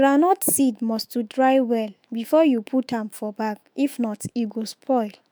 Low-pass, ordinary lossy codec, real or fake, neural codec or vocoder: none; none; fake; autoencoder, 48 kHz, 128 numbers a frame, DAC-VAE, trained on Japanese speech